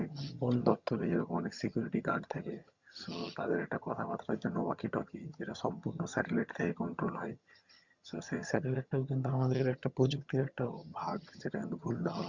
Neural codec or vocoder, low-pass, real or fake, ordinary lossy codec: vocoder, 22.05 kHz, 80 mel bands, HiFi-GAN; 7.2 kHz; fake; Opus, 64 kbps